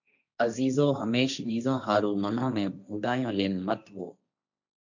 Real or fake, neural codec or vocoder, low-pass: fake; codec, 16 kHz, 1.1 kbps, Voila-Tokenizer; 7.2 kHz